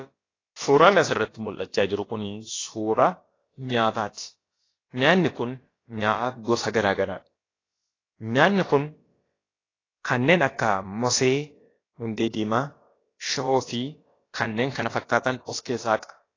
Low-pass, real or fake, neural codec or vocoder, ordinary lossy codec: 7.2 kHz; fake; codec, 16 kHz, about 1 kbps, DyCAST, with the encoder's durations; AAC, 32 kbps